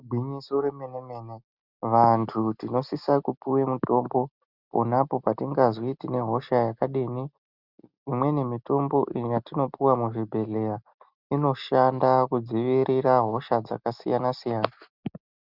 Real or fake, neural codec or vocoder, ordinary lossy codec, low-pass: real; none; Opus, 64 kbps; 5.4 kHz